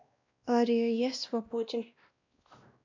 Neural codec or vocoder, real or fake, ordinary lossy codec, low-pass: codec, 16 kHz, 1 kbps, X-Codec, WavLM features, trained on Multilingual LibriSpeech; fake; AAC, 48 kbps; 7.2 kHz